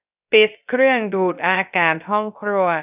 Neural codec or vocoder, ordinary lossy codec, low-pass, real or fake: codec, 16 kHz, 0.3 kbps, FocalCodec; none; 3.6 kHz; fake